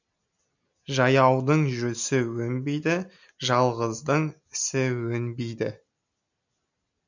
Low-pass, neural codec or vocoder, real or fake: 7.2 kHz; none; real